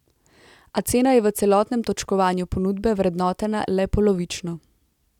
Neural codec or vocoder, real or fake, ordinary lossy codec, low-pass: none; real; none; 19.8 kHz